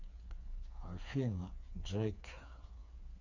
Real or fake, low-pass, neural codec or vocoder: fake; 7.2 kHz; codec, 16 kHz, 4 kbps, FreqCodec, smaller model